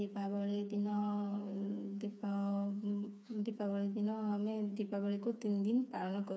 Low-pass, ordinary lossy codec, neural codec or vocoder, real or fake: none; none; codec, 16 kHz, 4 kbps, FreqCodec, smaller model; fake